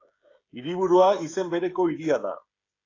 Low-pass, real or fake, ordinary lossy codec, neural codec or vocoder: 7.2 kHz; fake; AAC, 32 kbps; codec, 16 kHz, 16 kbps, FreqCodec, smaller model